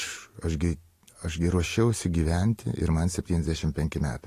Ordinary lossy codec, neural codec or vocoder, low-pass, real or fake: AAC, 48 kbps; none; 14.4 kHz; real